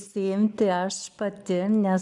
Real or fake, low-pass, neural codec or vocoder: real; 10.8 kHz; none